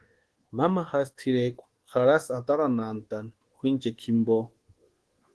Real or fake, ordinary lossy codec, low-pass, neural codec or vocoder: fake; Opus, 16 kbps; 10.8 kHz; codec, 24 kHz, 1.2 kbps, DualCodec